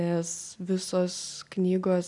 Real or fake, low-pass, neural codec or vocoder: real; 10.8 kHz; none